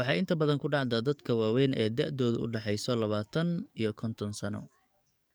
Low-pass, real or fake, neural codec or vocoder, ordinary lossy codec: none; fake; codec, 44.1 kHz, 7.8 kbps, DAC; none